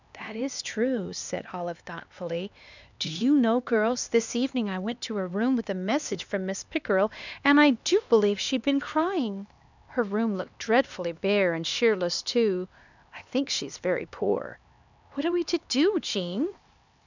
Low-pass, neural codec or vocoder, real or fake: 7.2 kHz; codec, 16 kHz, 2 kbps, X-Codec, HuBERT features, trained on LibriSpeech; fake